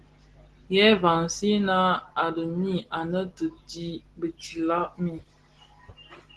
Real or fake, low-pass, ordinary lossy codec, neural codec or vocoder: real; 10.8 kHz; Opus, 16 kbps; none